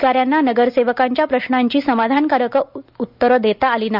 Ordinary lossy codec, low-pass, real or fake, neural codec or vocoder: none; 5.4 kHz; real; none